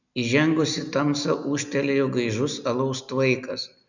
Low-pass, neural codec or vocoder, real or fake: 7.2 kHz; none; real